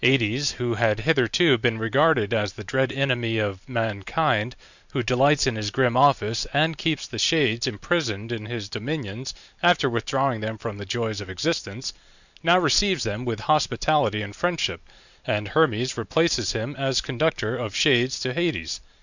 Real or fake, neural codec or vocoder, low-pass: fake; vocoder, 44.1 kHz, 128 mel bands every 512 samples, BigVGAN v2; 7.2 kHz